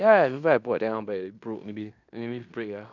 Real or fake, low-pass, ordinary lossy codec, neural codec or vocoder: fake; 7.2 kHz; none; codec, 16 kHz in and 24 kHz out, 0.9 kbps, LongCat-Audio-Codec, fine tuned four codebook decoder